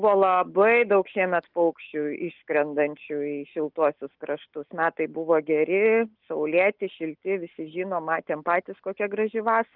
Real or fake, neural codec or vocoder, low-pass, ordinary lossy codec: real; none; 5.4 kHz; Opus, 32 kbps